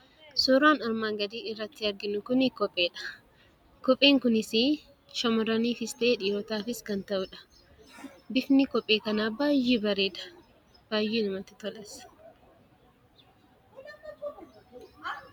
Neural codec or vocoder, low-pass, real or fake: none; 19.8 kHz; real